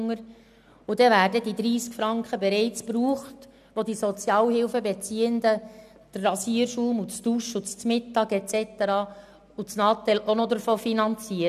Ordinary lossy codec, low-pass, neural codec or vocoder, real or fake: none; 14.4 kHz; none; real